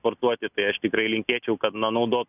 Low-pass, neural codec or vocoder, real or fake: 3.6 kHz; none; real